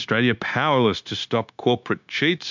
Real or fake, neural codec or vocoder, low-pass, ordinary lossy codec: fake; codec, 16 kHz, 0.9 kbps, LongCat-Audio-Codec; 7.2 kHz; MP3, 64 kbps